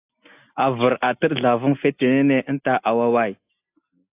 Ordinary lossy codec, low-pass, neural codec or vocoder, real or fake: AAC, 32 kbps; 3.6 kHz; none; real